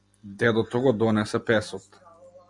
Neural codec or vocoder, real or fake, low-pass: none; real; 10.8 kHz